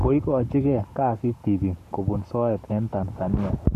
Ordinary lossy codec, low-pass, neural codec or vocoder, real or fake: none; 14.4 kHz; codec, 44.1 kHz, 7.8 kbps, Pupu-Codec; fake